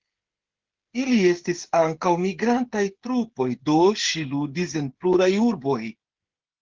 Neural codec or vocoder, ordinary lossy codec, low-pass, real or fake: codec, 16 kHz, 8 kbps, FreqCodec, smaller model; Opus, 16 kbps; 7.2 kHz; fake